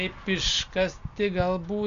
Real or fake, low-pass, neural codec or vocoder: real; 7.2 kHz; none